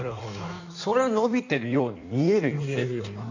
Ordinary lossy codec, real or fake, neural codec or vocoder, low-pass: none; fake; codec, 16 kHz in and 24 kHz out, 1.1 kbps, FireRedTTS-2 codec; 7.2 kHz